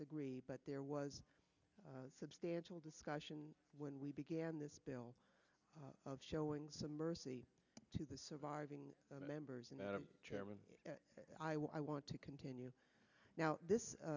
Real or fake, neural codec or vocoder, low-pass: real; none; 7.2 kHz